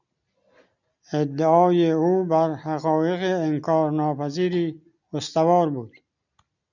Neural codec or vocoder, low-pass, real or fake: none; 7.2 kHz; real